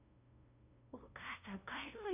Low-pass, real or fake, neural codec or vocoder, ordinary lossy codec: 3.6 kHz; fake; codec, 16 kHz, 0.5 kbps, FunCodec, trained on LibriTTS, 25 frames a second; none